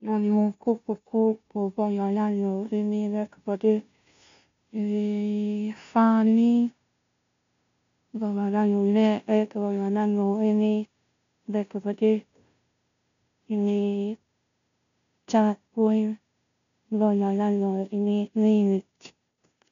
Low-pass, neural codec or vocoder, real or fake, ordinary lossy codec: 7.2 kHz; codec, 16 kHz, 0.5 kbps, FunCodec, trained on Chinese and English, 25 frames a second; fake; MP3, 64 kbps